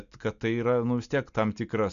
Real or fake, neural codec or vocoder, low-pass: real; none; 7.2 kHz